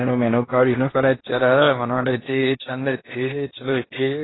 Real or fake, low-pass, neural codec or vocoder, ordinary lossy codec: fake; 7.2 kHz; codec, 16 kHz in and 24 kHz out, 0.8 kbps, FocalCodec, streaming, 65536 codes; AAC, 16 kbps